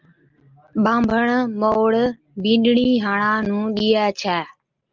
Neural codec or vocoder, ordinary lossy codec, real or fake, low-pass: none; Opus, 24 kbps; real; 7.2 kHz